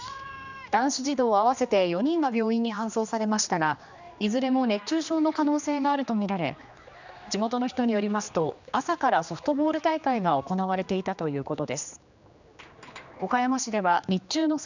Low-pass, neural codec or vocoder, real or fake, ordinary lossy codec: 7.2 kHz; codec, 16 kHz, 2 kbps, X-Codec, HuBERT features, trained on general audio; fake; none